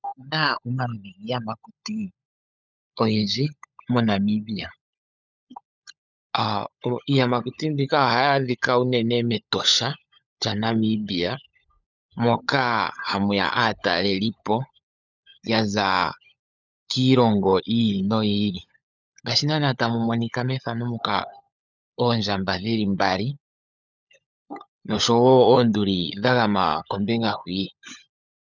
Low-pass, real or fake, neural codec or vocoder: 7.2 kHz; fake; codec, 16 kHz, 16 kbps, FunCodec, trained on LibriTTS, 50 frames a second